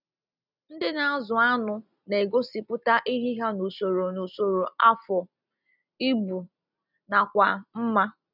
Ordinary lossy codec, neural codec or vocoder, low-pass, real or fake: none; none; 5.4 kHz; real